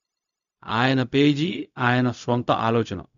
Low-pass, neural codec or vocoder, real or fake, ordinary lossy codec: 7.2 kHz; codec, 16 kHz, 0.4 kbps, LongCat-Audio-Codec; fake; none